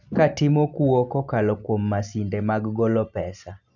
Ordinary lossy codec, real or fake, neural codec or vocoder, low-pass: none; real; none; 7.2 kHz